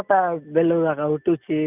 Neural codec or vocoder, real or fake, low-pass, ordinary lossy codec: none; real; 3.6 kHz; none